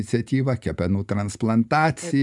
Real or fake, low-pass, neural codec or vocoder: fake; 10.8 kHz; vocoder, 44.1 kHz, 128 mel bands every 512 samples, BigVGAN v2